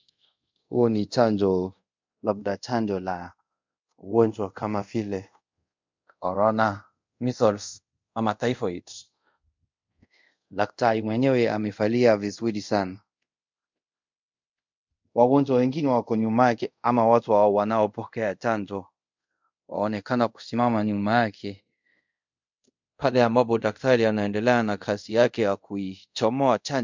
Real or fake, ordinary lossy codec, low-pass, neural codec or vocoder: fake; MP3, 64 kbps; 7.2 kHz; codec, 24 kHz, 0.5 kbps, DualCodec